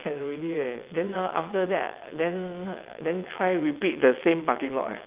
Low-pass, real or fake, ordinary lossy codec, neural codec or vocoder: 3.6 kHz; fake; Opus, 32 kbps; vocoder, 22.05 kHz, 80 mel bands, WaveNeXt